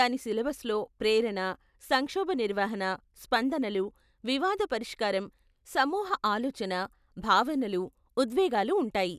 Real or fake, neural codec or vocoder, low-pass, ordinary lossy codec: real; none; 14.4 kHz; none